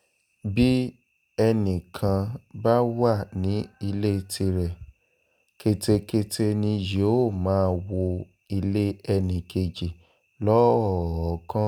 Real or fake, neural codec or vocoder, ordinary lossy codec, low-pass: real; none; none; 19.8 kHz